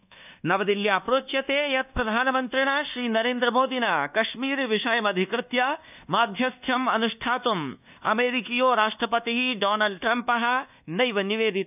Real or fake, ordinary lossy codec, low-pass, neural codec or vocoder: fake; none; 3.6 kHz; codec, 24 kHz, 1.2 kbps, DualCodec